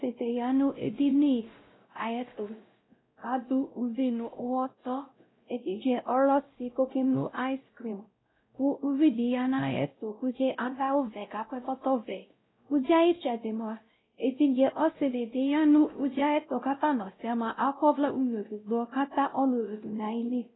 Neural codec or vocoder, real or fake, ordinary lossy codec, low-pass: codec, 16 kHz, 0.5 kbps, X-Codec, WavLM features, trained on Multilingual LibriSpeech; fake; AAC, 16 kbps; 7.2 kHz